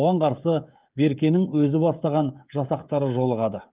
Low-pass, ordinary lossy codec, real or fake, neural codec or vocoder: 3.6 kHz; Opus, 24 kbps; fake; codec, 16 kHz, 16 kbps, FreqCodec, smaller model